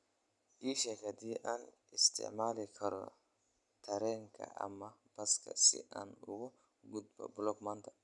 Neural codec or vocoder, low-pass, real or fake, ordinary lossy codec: none; 10.8 kHz; real; none